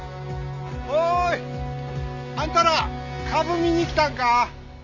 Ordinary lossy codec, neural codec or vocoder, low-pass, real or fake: none; none; 7.2 kHz; real